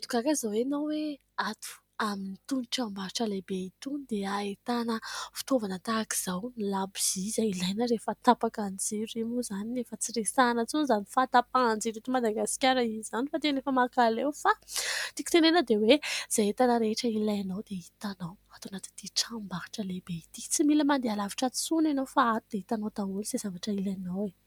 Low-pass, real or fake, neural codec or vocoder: 19.8 kHz; real; none